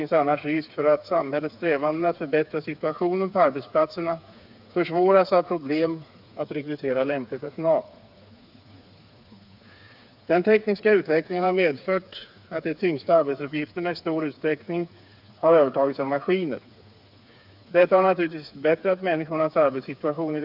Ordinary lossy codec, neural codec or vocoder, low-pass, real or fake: none; codec, 16 kHz, 4 kbps, FreqCodec, smaller model; 5.4 kHz; fake